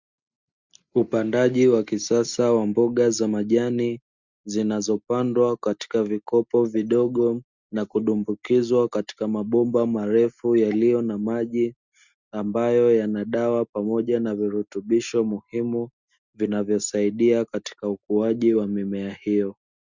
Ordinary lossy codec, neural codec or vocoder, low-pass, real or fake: Opus, 64 kbps; none; 7.2 kHz; real